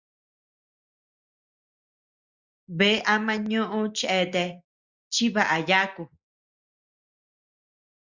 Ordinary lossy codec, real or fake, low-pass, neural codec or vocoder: Opus, 64 kbps; real; 7.2 kHz; none